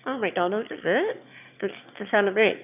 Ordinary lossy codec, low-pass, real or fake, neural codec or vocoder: none; 3.6 kHz; fake; autoencoder, 22.05 kHz, a latent of 192 numbers a frame, VITS, trained on one speaker